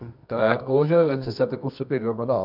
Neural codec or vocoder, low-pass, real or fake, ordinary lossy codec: codec, 24 kHz, 0.9 kbps, WavTokenizer, medium music audio release; 5.4 kHz; fake; none